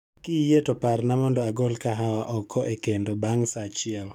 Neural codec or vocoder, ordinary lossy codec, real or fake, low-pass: codec, 44.1 kHz, 7.8 kbps, DAC; none; fake; 19.8 kHz